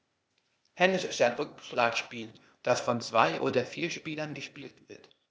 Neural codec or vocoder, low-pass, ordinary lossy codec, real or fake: codec, 16 kHz, 0.8 kbps, ZipCodec; none; none; fake